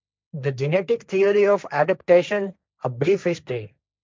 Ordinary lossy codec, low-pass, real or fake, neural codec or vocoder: none; none; fake; codec, 16 kHz, 1.1 kbps, Voila-Tokenizer